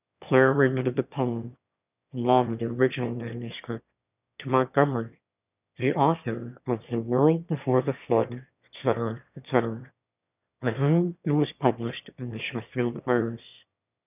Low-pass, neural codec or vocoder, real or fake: 3.6 kHz; autoencoder, 22.05 kHz, a latent of 192 numbers a frame, VITS, trained on one speaker; fake